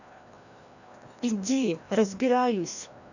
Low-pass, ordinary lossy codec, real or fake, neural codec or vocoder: 7.2 kHz; none; fake; codec, 16 kHz, 1 kbps, FreqCodec, larger model